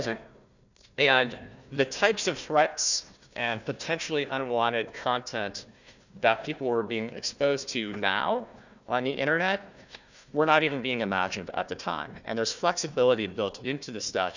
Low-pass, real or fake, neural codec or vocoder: 7.2 kHz; fake; codec, 16 kHz, 1 kbps, FunCodec, trained on Chinese and English, 50 frames a second